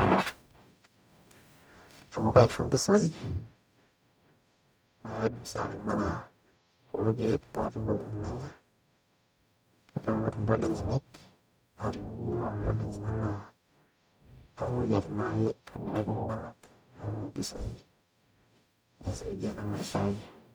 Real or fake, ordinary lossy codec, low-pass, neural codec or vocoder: fake; none; none; codec, 44.1 kHz, 0.9 kbps, DAC